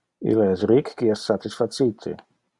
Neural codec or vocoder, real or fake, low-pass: none; real; 10.8 kHz